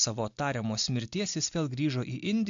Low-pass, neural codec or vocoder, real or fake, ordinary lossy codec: 7.2 kHz; none; real; AAC, 64 kbps